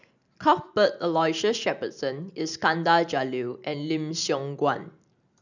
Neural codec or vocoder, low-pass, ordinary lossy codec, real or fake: none; 7.2 kHz; none; real